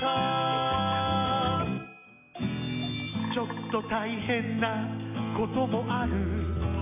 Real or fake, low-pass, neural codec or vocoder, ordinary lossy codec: real; 3.6 kHz; none; none